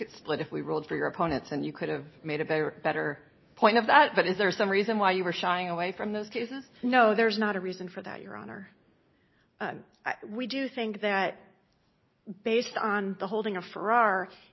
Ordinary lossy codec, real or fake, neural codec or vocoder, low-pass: MP3, 24 kbps; real; none; 7.2 kHz